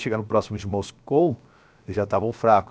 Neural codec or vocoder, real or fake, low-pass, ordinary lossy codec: codec, 16 kHz, 0.7 kbps, FocalCodec; fake; none; none